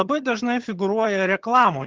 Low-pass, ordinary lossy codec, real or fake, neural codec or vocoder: 7.2 kHz; Opus, 16 kbps; fake; vocoder, 22.05 kHz, 80 mel bands, HiFi-GAN